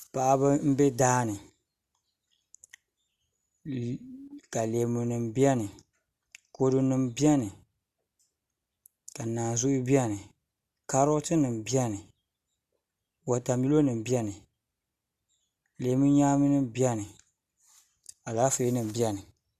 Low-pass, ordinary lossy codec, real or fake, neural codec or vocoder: 14.4 kHz; Opus, 64 kbps; real; none